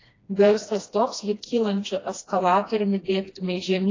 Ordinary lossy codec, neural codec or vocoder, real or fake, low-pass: AAC, 32 kbps; codec, 16 kHz, 1 kbps, FreqCodec, smaller model; fake; 7.2 kHz